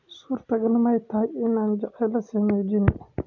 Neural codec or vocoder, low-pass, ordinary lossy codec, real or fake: none; 7.2 kHz; none; real